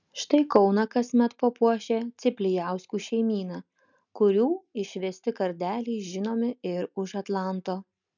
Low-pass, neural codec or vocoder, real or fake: 7.2 kHz; none; real